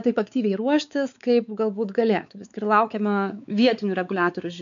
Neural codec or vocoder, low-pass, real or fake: codec, 16 kHz, 4 kbps, X-Codec, WavLM features, trained on Multilingual LibriSpeech; 7.2 kHz; fake